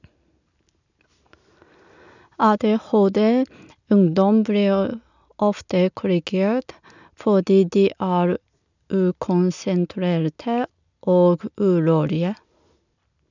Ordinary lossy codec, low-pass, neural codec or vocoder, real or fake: none; 7.2 kHz; none; real